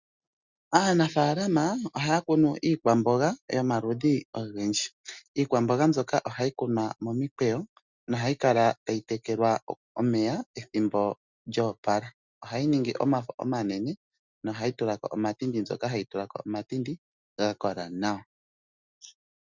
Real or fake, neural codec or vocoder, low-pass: real; none; 7.2 kHz